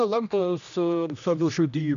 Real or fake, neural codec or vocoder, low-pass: fake; codec, 16 kHz, 1 kbps, X-Codec, HuBERT features, trained on general audio; 7.2 kHz